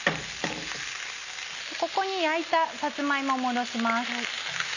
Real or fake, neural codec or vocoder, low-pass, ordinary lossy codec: real; none; 7.2 kHz; none